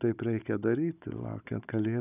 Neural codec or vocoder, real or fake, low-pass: codec, 16 kHz, 16 kbps, FunCodec, trained on Chinese and English, 50 frames a second; fake; 3.6 kHz